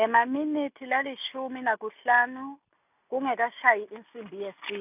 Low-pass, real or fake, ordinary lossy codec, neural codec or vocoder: 3.6 kHz; real; none; none